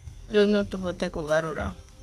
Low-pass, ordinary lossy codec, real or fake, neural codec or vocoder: 14.4 kHz; none; fake; codec, 32 kHz, 1.9 kbps, SNAC